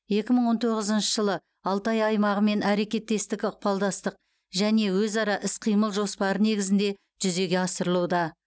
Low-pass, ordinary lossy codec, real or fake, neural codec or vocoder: none; none; real; none